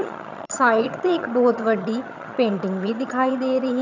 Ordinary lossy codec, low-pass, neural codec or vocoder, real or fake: none; 7.2 kHz; vocoder, 22.05 kHz, 80 mel bands, HiFi-GAN; fake